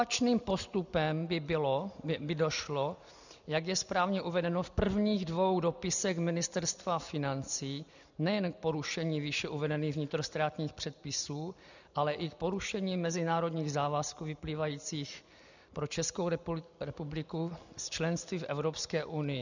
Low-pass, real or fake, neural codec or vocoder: 7.2 kHz; real; none